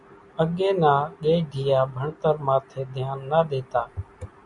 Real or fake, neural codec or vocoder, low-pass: real; none; 10.8 kHz